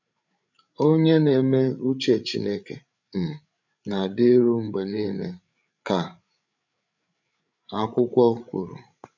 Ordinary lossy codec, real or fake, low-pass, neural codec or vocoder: none; fake; 7.2 kHz; codec, 16 kHz, 8 kbps, FreqCodec, larger model